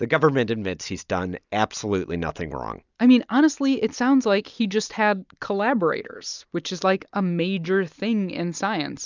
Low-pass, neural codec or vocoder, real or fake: 7.2 kHz; none; real